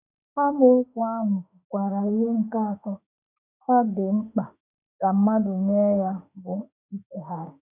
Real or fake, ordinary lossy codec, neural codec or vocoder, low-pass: fake; none; autoencoder, 48 kHz, 32 numbers a frame, DAC-VAE, trained on Japanese speech; 3.6 kHz